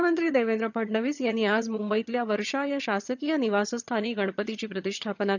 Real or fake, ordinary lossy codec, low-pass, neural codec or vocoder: fake; none; 7.2 kHz; vocoder, 22.05 kHz, 80 mel bands, HiFi-GAN